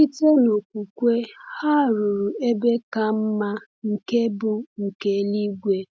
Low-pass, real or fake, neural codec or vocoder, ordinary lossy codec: none; real; none; none